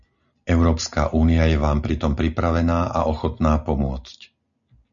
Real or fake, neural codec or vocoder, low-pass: real; none; 7.2 kHz